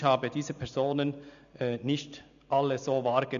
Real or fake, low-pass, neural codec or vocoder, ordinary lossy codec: real; 7.2 kHz; none; none